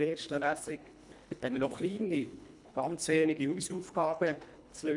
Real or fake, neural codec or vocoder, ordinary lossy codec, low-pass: fake; codec, 24 kHz, 1.5 kbps, HILCodec; none; 10.8 kHz